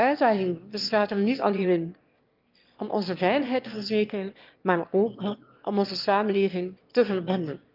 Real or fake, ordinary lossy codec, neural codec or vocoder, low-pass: fake; Opus, 24 kbps; autoencoder, 22.05 kHz, a latent of 192 numbers a frame, VITS, trained on one speaker; 5.4 kHz